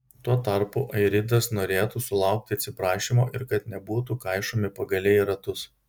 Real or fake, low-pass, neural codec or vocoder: real; 19.8 kHz; none